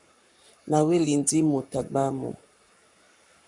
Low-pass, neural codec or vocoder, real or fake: 10.8 kHz; codec, 44.1 kHz, 7.8 kbps, Pupu-Codec; fake